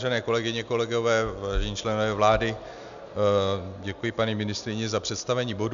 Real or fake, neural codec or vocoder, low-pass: real; none; 7.2 kHz